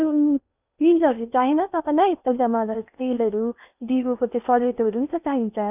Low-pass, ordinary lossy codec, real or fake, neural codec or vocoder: 3.6 kHz; none; fake; codec, 16 kHz in and 24 kHz out, 0.8 kbps, FocalCodec, streaming, 65536 codes